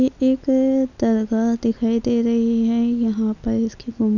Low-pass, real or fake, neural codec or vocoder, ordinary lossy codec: 7.2 kHz; real; none; none